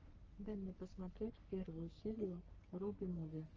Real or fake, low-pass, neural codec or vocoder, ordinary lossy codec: fake; 7.2 kHz; codec, 44.1 kHz, 3.4 kbps, Pupu-Codec; Opus, 24 kbps